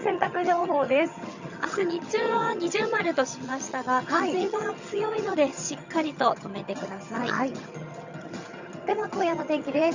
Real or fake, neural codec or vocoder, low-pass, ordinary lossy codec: fake; vocoder, 22.05 kHz, 80 mel bands, HiFi-GAN; 7.2 kHz; Opus, 64 kbps